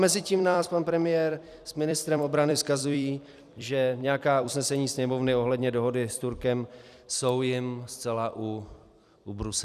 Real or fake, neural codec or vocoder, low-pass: fake; vocoder, 44.1 kHz, 128 mel bands every 256 samples, BigVGAN v2; 14.4 kHz